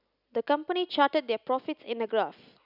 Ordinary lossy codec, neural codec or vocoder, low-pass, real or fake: none; none; 5.4 kHz; real